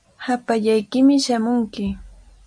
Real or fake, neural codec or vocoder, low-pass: real; none; 9.9 kHz